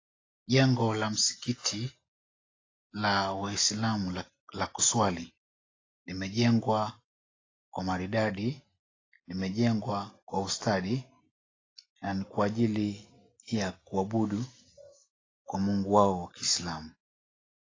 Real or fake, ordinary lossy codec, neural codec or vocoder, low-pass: real; AAC, 32 kbps; none; 7.2 kHz